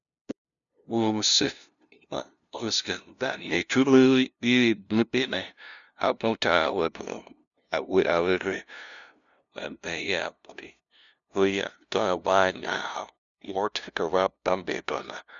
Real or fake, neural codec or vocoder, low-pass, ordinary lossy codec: fake; codec, 16 kHz, 0.5 kbps, FunCodec, trained on LibriTTS, 25 frames a second; 7.2 kHz; MP3, 96 kbps